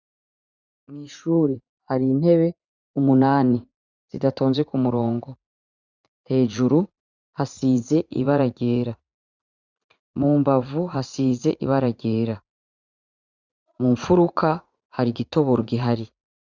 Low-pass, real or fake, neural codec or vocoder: 7.2 kHz; fake; vocoder, 44.1 kHz, 80 mel bands, Vocos